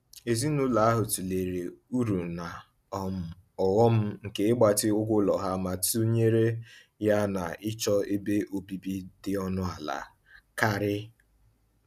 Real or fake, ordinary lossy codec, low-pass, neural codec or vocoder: real; none; 14.4 kHz; none